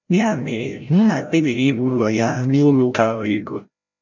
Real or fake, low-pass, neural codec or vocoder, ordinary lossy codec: fake; 7.2 kHz; codec, 16 kHz, 0.5 kbps, FreqCodec, larger model; none